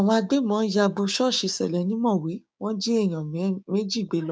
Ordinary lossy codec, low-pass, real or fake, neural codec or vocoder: none; none; fake; codec, 16 kHz, 6 kbps, DAC